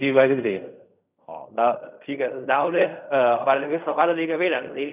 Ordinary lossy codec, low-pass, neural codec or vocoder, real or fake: none; 3.6 kHz; codec, 16 kHz in and 24 kHz out, 0.4 kbps, LongCat-Audio-Codec, fine tuned four codebook decoder; fake